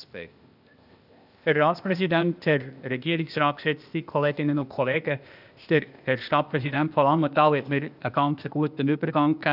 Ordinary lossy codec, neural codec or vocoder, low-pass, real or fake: none; codec, 16 kHz, 0.8 kbps, ZipCodec; 5.4 kHz; fake